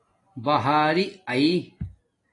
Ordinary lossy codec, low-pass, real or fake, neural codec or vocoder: AAC, 32 kbps; 10.8 kHz; real; none